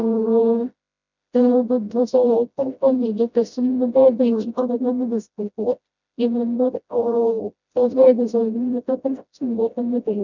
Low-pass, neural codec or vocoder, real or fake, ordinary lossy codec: 7.2 kHz; codec, 16 kHz, 0.5 kbps, FreqCodec, smaller model; fake; none